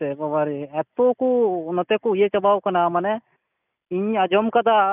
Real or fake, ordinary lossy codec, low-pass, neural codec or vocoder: real; none; 3.6 kHz; none